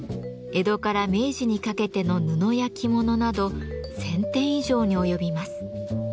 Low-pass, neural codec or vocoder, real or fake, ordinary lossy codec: none; none; real; none